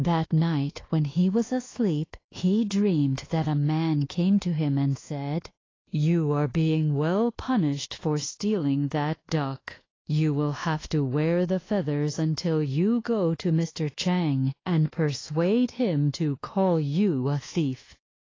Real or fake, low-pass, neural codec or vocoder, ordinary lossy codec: fake; 7.2 kHz; codec, 24 kHz, 1.2 kbps, DualCodec; AAC, 32 kbps